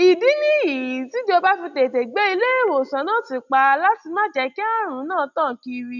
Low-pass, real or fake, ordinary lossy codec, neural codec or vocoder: 7.2 kHz; real; none; none